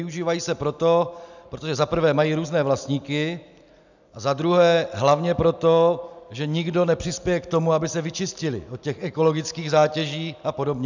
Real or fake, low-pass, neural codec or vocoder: real; 7.2 kHz; none